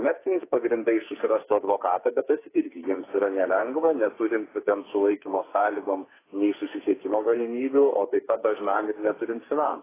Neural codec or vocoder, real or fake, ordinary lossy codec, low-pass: codec, 44.1 kHz, 2.6 kbps, SNAC; fake; AAC, 16 kbps; 3.6 kHz